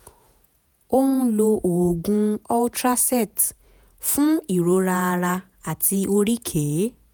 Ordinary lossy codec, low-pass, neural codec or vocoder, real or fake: none; none; vocoder, 48 kHz, 128 mel bands, Vocos; fake